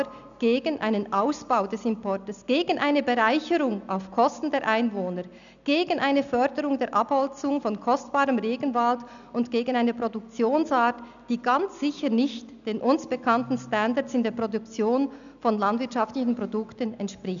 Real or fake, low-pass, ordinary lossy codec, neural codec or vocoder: real; 7.2 kHz; none; none